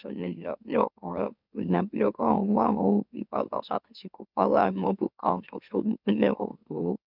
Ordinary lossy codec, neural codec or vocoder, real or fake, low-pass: none; autoencoder, 44.1 kHz, a latent of 192 numbers a frame, MeloTTS; fake; 5.4 kHz